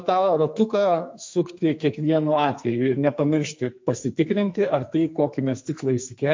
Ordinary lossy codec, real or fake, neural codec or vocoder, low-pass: MP3, 48 kbps; fake; codec, 44.1 kHz, 2.6 kbps, SNAC; 7.2 kHz